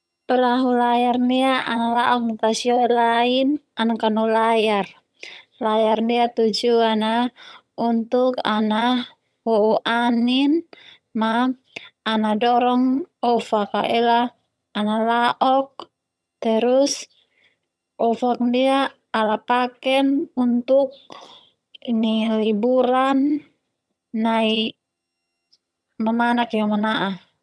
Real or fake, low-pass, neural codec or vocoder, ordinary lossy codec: fake; none; vocoder, 22.05 kHz, 80 mel bands, HiFi-GAN; none